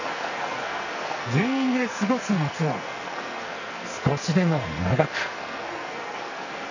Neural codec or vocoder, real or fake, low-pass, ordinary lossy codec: codec, 44.1 kHz, 2.6 kbps, SNAC; fake; 7.2 kHz; none